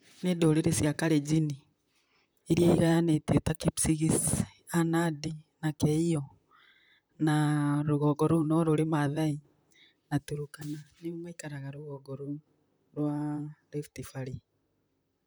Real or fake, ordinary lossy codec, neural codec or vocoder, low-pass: fake; none; vocoder, 44.1 kHz, 128 mel bands, Pupu-Vocoder; none